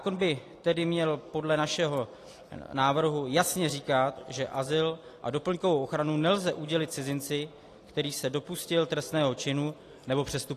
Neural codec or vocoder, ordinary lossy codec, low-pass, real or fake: none; AAC, 48 kbps; 14.4 kHz; real